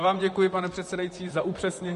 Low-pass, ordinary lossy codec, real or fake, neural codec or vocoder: 14.4 kHz; MP3, 48 kbps; fake; vocoder, 44.1 kHz, 128 mel bands, Pupu-Vocoder